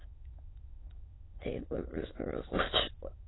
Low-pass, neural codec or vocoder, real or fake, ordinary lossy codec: 7.2 kHz; autoencoder, 22.05 kHz, a latent of 192 numbers a frame, VITS, trained on many speakers; fake; AAC, 16 kbps